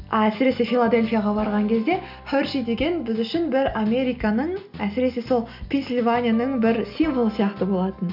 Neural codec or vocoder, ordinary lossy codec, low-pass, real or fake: none; none; 5.4 kHz; real